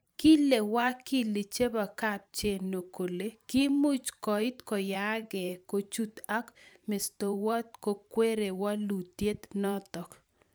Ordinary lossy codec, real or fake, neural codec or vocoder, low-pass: none; fake; vocoder, 44.1 kHz, 128 mel bands every 512 samples, BigVGAN v2; none